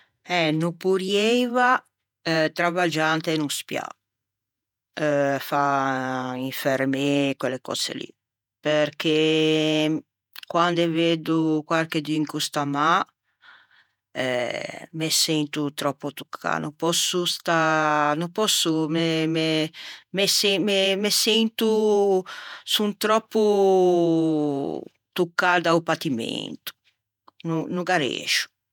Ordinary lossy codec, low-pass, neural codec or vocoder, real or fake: none; 19.8 kHz; vocoder, 48 kHz, 128 mel bands, Vocos; fake